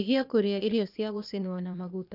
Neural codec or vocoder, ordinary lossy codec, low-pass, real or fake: codec, 16 kHz, 0.8 kbps, ZipCodec; none; 5.4 kHz; fake